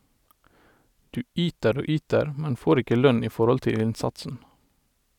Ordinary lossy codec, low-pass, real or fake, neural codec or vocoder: none; 19.8 kHz; real; none